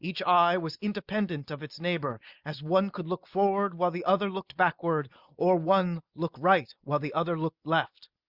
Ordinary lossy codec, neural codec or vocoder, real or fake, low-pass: Opus, 64 kbps; none; real; 5.4 kHz